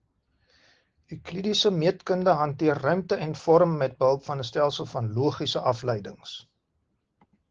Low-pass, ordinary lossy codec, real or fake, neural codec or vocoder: 7.2 kHz; Opus, 16 kbps; real; none